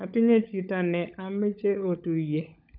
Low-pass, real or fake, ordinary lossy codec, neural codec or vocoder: 5.4 kHz; fake; none; codec, 16 kHz, 16 kbps, FunCodec, trained on LibriTTS, 50 frames a second